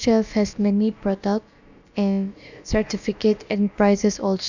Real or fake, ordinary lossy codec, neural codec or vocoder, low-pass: fake; none; codec, 16 kHz, about 1 kbps, DyCAST, with the encoder's durations; 7.2 kHz